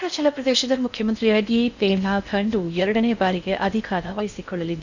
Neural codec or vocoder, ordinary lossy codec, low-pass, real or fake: codec, 16 kHz in and 24 kHz out, 0.6 kbps, FocalCodec, streaming, 4096 codes; none; 7.2 kHz; fake